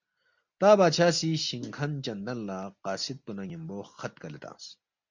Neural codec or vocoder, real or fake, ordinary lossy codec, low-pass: none; real; MP3, 64 kbps; 7.2 kHz